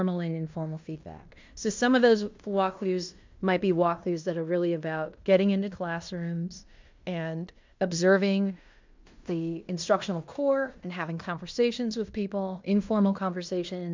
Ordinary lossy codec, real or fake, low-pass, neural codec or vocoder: MP3, 64 kbps; fake; 7.2 kHz; codec, 16 kHz in and 24 kHz out, 0.9 kbps, LongCat-Audio-Codec, fine tuned four codebook decoder